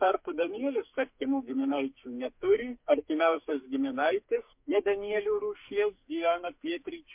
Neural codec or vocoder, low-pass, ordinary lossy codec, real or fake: codec, 44.1 kHz, 2.6 kbps, SNAC; 3.6 kHz; MP3, 32 kbps; fake